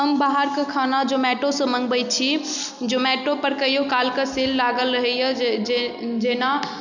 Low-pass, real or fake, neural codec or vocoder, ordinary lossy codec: 7.2 kHz; real; none; none